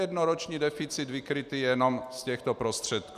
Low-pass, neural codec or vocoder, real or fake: 14.4 kHz; none; real